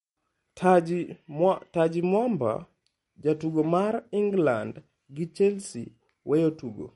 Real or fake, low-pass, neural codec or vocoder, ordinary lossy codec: real; 19.8 kHz; none; MP3, 48 kbps